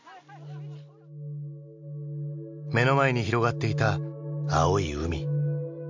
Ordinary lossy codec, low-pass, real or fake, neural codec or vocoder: MP3, 64 kbps; 7.2 kHz; real; none